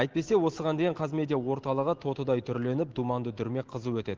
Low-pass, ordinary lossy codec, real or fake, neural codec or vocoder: 7.2 kHz; Opus, 16 kbps; real; none